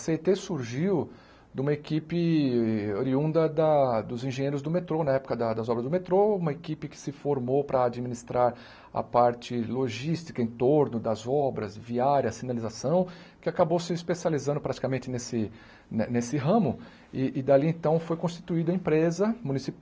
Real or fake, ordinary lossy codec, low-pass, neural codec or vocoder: real; none; none; none